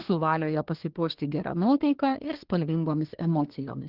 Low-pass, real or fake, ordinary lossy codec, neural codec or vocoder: 5.4 kHz; fake; Opus, 16 kbps; codec, 24 kHz, 1 kbps, SNAC